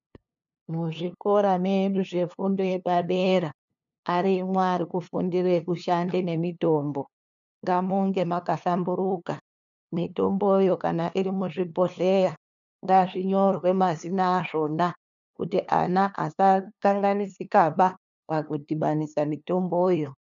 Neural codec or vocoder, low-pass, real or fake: codec, 16 kHz, 2 kbps, FunCodec, trained on LibriTTS, 25 frames a second; 7.2 kHz; fake